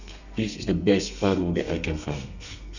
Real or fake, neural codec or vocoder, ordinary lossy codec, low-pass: fake; codec, 32 kHz, 1.9 kbps, SNAC; none; 7.2 kHz